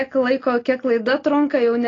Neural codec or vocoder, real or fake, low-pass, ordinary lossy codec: none; real; 7.2 kHz; AAC, 32 kbps